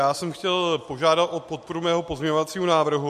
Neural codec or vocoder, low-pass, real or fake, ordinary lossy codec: none; 14.4 kHz; real; MP3, 64 kbps